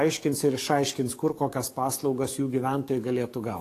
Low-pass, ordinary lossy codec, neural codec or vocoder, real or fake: 14.4 kHz; AAC, 48 kbps; codec, 44.1 kHz, 7.8 kbps, DAC; fake